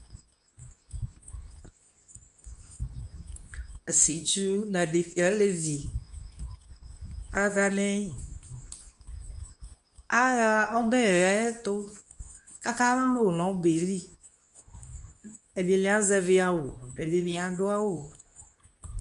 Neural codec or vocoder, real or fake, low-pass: codec, 24 kHz, 0.9 kbps, WavTokenizer, medium speech release version 2; fake; 10.8 kHz